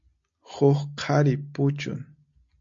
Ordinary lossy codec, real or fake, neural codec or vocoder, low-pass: MP3, 48 kbps; real; none; 7.2 kHz